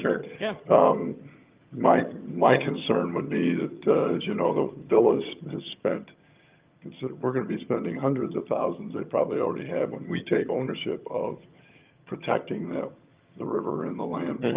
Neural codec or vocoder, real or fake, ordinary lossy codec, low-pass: vocoder, 22.05 kHz, 80 mel bands, HiFi-GAN; fake; Opus, 24 kbps; 3.6 kHz